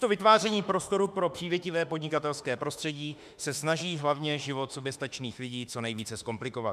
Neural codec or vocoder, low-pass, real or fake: autoencoder, 48 kHz, 32 numbers a frame, DAC-VAE, trained on Japanese speech; 14.4 kHz; fake